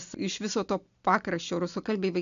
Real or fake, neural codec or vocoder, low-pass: real; none; 7.2 kHz